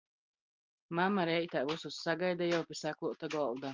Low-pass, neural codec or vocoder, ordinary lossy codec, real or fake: 7.2 kHz; none; Opus, 32 kbps; real